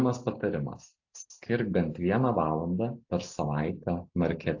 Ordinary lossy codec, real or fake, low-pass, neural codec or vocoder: AAC, 48 kbps; real; 7.2 kHz; none